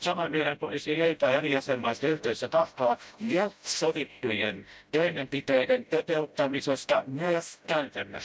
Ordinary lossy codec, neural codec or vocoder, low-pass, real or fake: none; codec, 16 kHz, 0.5 kbps, FreqCodec, smaller model; none; fake